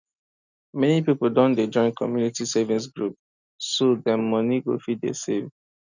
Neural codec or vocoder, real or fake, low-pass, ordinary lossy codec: none; real; 7.2 kHz; none